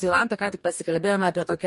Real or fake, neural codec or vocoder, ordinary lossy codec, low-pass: fake; codec, 44.1 kHz, 2.6 kbps, DAC; MP3, 48 kbps; 14.4 kHz